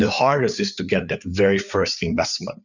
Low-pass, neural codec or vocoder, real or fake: 7.2 kHz; codec, 16 kHz, 4 kbps, FreqCodec, larger model; fake